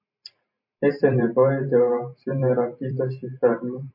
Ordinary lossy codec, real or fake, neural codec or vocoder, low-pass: MP3, 32 kbps; fake; vocoder, 44.1 kHz, 128 mel bands every 512 samples, BigVGAN v2; 5.4 kHz